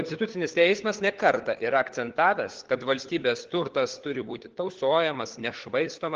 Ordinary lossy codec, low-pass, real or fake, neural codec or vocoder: Opus, 16 kbps; 7.2 kHz; fake; codec, 16 kHz, 4 kbps, FunCodec, trained on LibriTTS, 50 frames a second